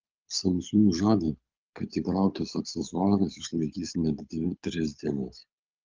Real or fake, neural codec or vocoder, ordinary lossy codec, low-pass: fake; codec, 24 kHz, 6 kbps, HILCodec; Opus, 24 kbps; 7.2 kHz